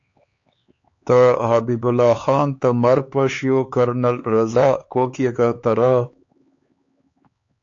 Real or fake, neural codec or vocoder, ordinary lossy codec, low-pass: fake; codec, 16 kHz, 4 kbps, X-Codec, HuBERT features, trained on LibriSpeech; AAC, 48 kbps; 7.2 kHz